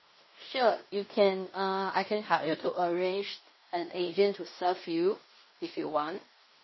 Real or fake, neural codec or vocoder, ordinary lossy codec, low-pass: fake; codec, 16 kHz in and 24 kHz out, 0.9 kbps, LongCat-Audio-Codec, fine tuned four codebook decoder; MP3, 24 kbps; 7.2 kHz